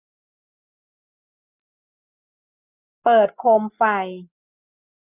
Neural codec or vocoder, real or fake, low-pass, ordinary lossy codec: none; real; 3.6 kHz; none